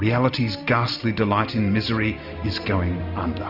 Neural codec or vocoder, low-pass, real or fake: none; 5.4 kHz; real